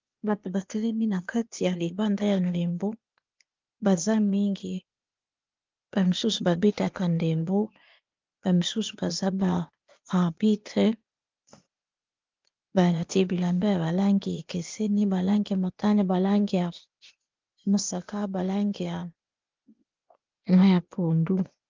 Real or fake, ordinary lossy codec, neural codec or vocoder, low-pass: fake; Opus, 24 kbps; codec, 16 kHz, 0.8 kbps, ZipCodec; 7.2 kHz